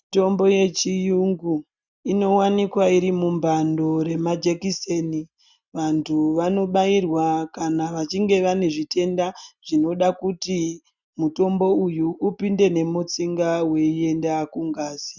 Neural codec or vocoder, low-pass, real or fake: none; 7.2 kHz; real